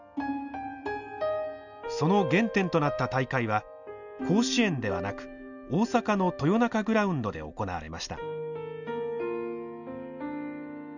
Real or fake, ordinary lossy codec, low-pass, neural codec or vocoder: real; none; 7.2 kHz; none